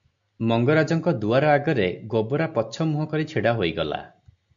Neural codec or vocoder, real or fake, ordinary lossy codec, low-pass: none; real; MP3, 64 kbps; 7.2 kHz